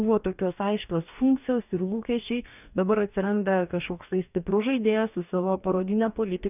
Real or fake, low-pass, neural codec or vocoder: fake; 3.6 kHz; codec, 44.1 kHz, 2.6 kbps, DAC